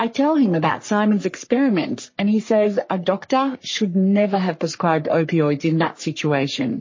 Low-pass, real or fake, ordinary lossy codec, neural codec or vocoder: 7.2 kHz; fake; MP3, 32 kbps; codec, 44.1 kHz, 3.4 kbps, Pupu-Codec